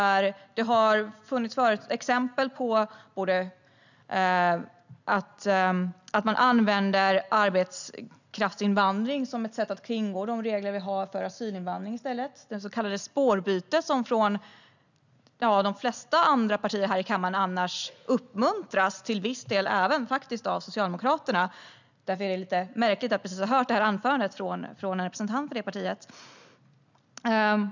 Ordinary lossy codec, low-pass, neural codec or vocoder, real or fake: none; 7.2 kHz; none; real